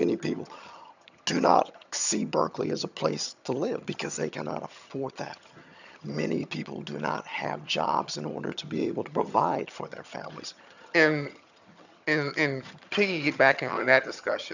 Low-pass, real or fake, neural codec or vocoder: 7.2 kHz; fake; vocoder, 22.05 kHz, 80 mel bands, HiFi-GAN